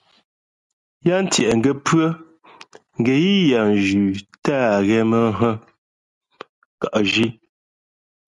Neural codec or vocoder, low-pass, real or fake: none; 10.8 kHz; real